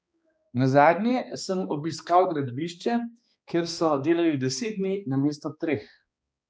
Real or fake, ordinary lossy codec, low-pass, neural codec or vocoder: fake; none; none; codec, 16 kHz, 2 kbps, X-Codec, HuBERT features, trained on balanced general audio